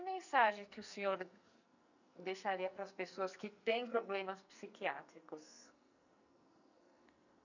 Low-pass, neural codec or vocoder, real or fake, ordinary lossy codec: 7.2 kHz; codec, 44.1 kHz, 2.6 kbps, SNAC; fake; none